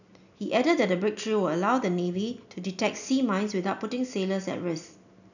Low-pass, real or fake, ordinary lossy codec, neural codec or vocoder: 7.2 kHz; real; none; none